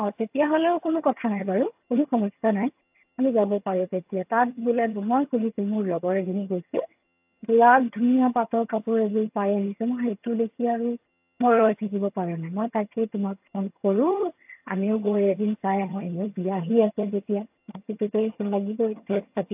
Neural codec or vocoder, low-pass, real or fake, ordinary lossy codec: vocoder, 22.05 kHz, 80 mel bands, HiFi-GAN; 3.6 kHz; fake; AAC, 32 kbps